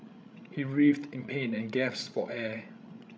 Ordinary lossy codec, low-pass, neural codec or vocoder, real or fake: none; none; codec, 16 kHz, 16 kbps, FreqCodec, larger model; fake